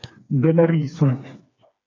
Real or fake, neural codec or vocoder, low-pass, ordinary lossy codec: fake; codec, 16 kHz, 4 kbps, FreqCodec, smaller model; 7.2 kHz; AAC, 32 kbps